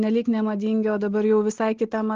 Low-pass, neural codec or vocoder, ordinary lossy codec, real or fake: 7.2 kHz; none; Opus, 16 kbps; real